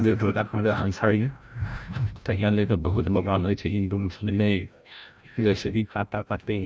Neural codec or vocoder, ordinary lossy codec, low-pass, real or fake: codec, 16 kHz, 0.5 kbps, FreqCodec, larger model; none; none; fake